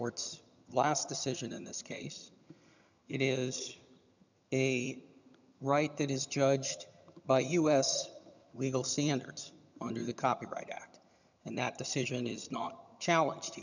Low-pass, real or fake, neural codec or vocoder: 7.2 kHz; fake; vocoder, 22.05 kHz, 80 mel bands, HiFi-GAN